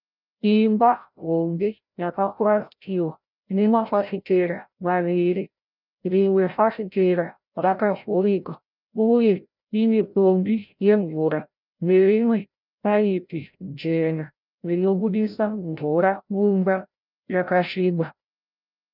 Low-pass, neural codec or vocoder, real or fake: 5.4 kHz; codec, 16 kHz, 0.5 kbps, FreqCodec, larger model; fake